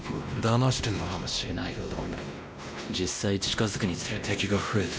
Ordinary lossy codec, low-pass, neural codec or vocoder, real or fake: none; none; codec, 16 kHz, 1 kbps, X-Codec, WavLM features, trained on Multilingual LibriSpeech; fake